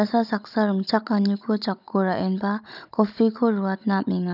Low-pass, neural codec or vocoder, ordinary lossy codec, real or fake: 5.4 kHz; codec, 16 kHz, 16 kbps, FunCodec, trained on Chinese and English, 50 frames a second; none; fake